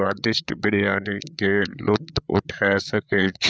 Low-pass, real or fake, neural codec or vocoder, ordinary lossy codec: none; fake; codec, 16 kHz, 8 kbps, FreqCodec, larger model; none